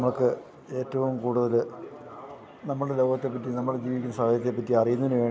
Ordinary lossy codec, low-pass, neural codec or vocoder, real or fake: none; none; none; real